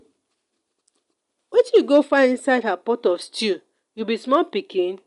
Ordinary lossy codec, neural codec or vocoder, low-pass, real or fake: none; none; 10.8 kHz; real